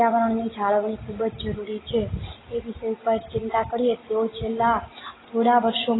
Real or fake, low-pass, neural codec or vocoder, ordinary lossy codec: real; 7.2 kHz; none; AAC, 16 kbps